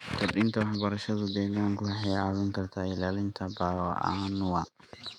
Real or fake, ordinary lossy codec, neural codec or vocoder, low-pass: fake; none; autoencoder, 48 kHz, 128 numbers a frame, DAC-VAE, trained on Japanese speech; 19.8 kHz